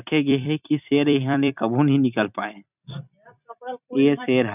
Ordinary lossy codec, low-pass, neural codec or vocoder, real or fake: none; 3.6 kHz; vocoder, 44.1 kHz, 128 mel bands every 256 samples, BigVGAN v2; fake